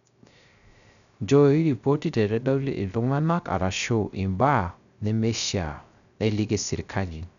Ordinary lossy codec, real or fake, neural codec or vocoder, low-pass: none; fake; codec, 16 kHz, 0.3 kbps, FocalCodec; 7.2 kHz